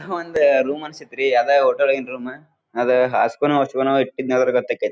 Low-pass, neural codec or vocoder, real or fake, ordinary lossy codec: none; none; real; none